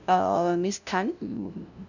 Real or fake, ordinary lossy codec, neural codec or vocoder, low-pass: fake; none; codec, 16 kHz, 0.5 kbps, FunCodec, trained on LibriTTS, 25 frames a second; 7.2 kHz